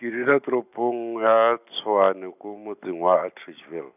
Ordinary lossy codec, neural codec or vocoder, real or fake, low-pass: none; none; real; 3.6 kHz